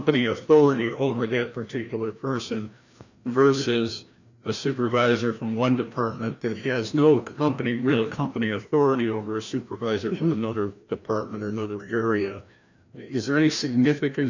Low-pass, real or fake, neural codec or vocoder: 7.2 kHz; fake; codec, 16 kHz, 1 kbps, FreqCodec, larger model